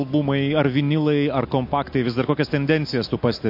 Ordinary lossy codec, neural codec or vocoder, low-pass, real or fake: MP3, 32 kbps; none; 5.4 kHz; real